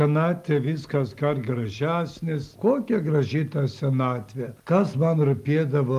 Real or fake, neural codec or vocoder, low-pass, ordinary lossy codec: real; none; 14.4 kHz; Opus, 32 kbps